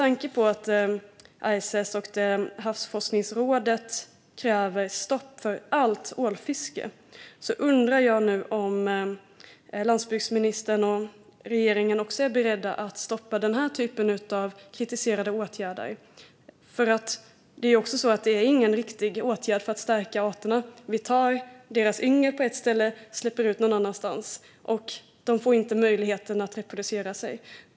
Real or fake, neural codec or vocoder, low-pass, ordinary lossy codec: real; none; none; none